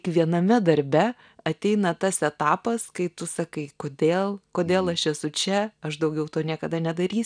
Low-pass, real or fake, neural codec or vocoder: 9.9 kHz; real; none